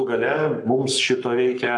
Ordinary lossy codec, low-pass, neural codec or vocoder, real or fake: AAC, 64 kbps; 10.8 kHz; codec, 44.1 kHz, 7.8 kbps, Pupu-Codec; fake